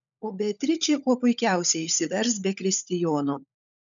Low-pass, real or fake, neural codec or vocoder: 7.2 kHz; fake; codec, 16 kHz, 16 kbps, FunCodec, trained on LibriTTS, 50 frames a second